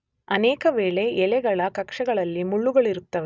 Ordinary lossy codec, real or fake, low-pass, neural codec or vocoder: none; real; none; none